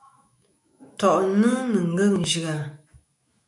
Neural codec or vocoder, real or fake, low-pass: autoencoder, 48 kHz, 128 numbers a frame, DAC-VAE, trained on Japanese speech; fake; 10.8 kHz